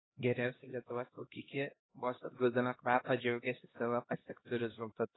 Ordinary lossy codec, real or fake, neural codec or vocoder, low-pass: AAC, 16 kbps; fake; codec, 16 kHz, 1 kbps, X-Codec, HuBERT features, trained on LibriSpeech; 7.2 kHz